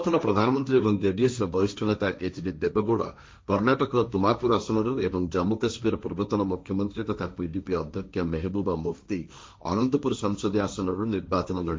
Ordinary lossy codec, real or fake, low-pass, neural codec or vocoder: none; fake; none; codec, 16 kHz, 1.1 kbps, Voila-Tokenizer